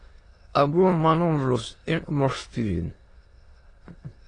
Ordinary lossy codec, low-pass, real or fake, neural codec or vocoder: AAC, 32 kbps; 9.9 kHz; fake; autoencoder, 22.05 kHz, a latent of 192 numbers a frame, VITS, trained on many speakers